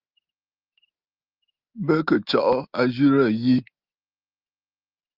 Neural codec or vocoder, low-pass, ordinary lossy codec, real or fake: none; 5.4 kHz; Opus, 24 kbps; real